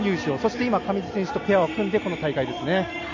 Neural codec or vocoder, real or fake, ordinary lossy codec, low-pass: none; real; none; 7.2 kHz